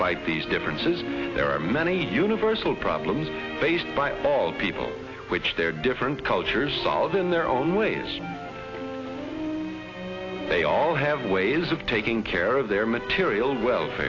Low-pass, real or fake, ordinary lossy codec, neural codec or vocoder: 7.2 kHz; real; AAC, 32 kbps; none